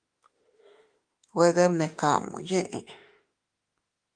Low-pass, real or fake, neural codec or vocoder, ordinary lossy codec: 9.9 kHz; fake; autoencoder, 48 kHz, 32 numbers a frame, DAC-VAE, trained on Japanese speech; Opus, 24 kbps